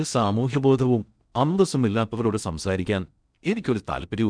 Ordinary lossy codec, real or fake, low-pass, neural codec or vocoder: none; fake; 9.9 kHz; codec, 16 kHz in and 24 kHz out, 0.6 kbps, FocalCodec, streaming, 2048 codes